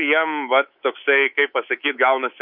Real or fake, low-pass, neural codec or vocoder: fake; 5.4 kHz; codec, 24 kHz, 3.1 kbps, DualCodec